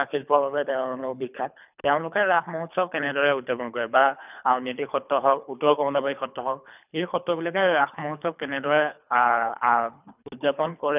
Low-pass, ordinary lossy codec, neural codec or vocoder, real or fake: 3.6 kHz; none; codec, 24 kHz, 3 kbps, HILCodec; fake